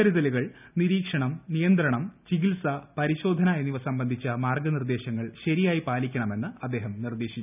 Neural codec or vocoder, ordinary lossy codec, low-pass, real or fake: none; none; 3.6 kHz; real